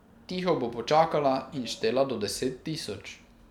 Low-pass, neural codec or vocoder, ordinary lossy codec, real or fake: 19.8 kHz; vocoder, 44.1 kHz, 128 mel bands every 256 samples, BigVGAN v2; none; fake